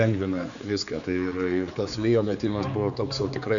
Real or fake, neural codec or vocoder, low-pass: fake; codec, 16 kHz, 4 kbps, X-Codec, HuBERT features, trained on general audio; 7.2 kHz